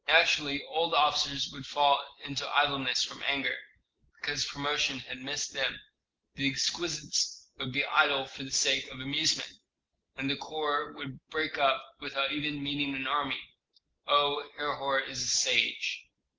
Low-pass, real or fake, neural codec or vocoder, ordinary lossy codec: 7.2 kHz; real; none; Opus, 16 kbps